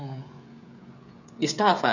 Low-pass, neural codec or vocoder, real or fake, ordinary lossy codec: 7.2 kHz; codec, 16 kHz, 16 kbps, FreqCodec, smaller model; fake; none